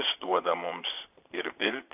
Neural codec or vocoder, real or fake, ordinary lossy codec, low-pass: vocoder, 22.05 kHz, 80 mel bands, Vocos; fake; AAC, 32 kbps; 3.6 kHz